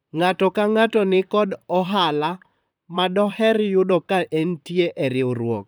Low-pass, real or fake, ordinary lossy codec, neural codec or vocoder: none; fake; none; vocoder, 44.1 kHz, 128 mel bands, Pupu-Vocoder